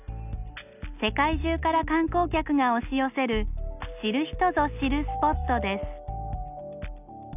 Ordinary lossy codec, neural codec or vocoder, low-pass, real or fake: none; none; 3.6 kHz; real